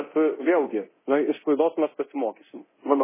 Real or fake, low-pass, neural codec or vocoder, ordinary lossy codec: fake; 3.6 kHz; codec, 24 kHz, 0.9 kbps, DualCodec; MP3, 16 kbps